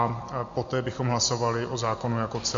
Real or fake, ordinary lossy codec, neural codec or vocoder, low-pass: real; MP3, 48 kbps; none; 7.2 kHz